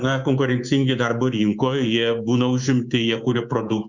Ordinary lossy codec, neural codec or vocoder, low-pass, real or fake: Opus, 64 kbps; codec, 24 kHz, 3.1 kbps, DualCodec; 7.2 kHz; fake